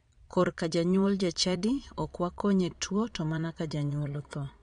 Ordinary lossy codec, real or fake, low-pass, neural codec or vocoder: MP3, 64 kbps; fake; 9.9 kHz; vocoder, 22.05 kHz, 80 mel bands, Vocos